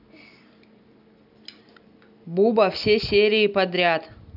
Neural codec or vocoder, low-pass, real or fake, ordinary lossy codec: none; 5.4 kHz; real; none